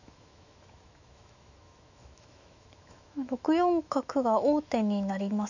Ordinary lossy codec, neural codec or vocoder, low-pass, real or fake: none; autoencoder, 48 kHz, 128 numbers a frame, DAC-VAE, trained on Japanese speech; 7.2 kHz; fake